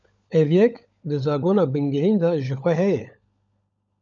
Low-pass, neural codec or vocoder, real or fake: 7.2 kHz; codec, 16 kHz, 16 kbps, FunCodec, trained on LibriTTS, 50 frames a second; fake